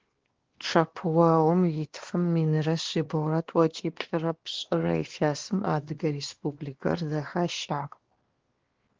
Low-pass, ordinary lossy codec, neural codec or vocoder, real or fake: 7.2 kHz; Opus, 16 kbps; codec, 16 kHz, 2 kbps, X-Codec, WavLM features, trained on Multilingual LibriSpeech; fake